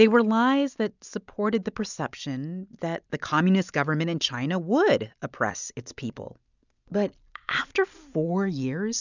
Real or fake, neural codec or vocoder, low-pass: real; none; 7.2 kHz